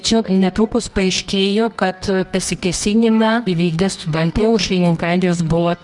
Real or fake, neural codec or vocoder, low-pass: fake; codec, 24 kHz, 0.9 kbps, WavTokenizer, medium music audio release; 10.8 kHz